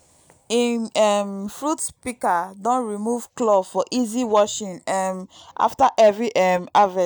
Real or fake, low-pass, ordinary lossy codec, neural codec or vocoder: real; none; none; none